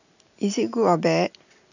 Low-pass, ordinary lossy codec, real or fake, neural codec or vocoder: 7.2 kHz; none; real; none